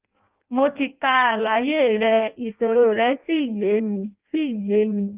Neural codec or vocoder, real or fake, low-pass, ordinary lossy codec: codec, 16 kHz in and 24 kHz out, 0.6 kbps, FireRedTTS-2 codec; fake; 3.6 kHz; Opus, 32 kbps